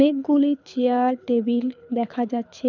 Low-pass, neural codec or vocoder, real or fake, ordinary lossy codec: 7.2 kHz; codec, 24 kHz, 6 kbps, HILCodec; fake; none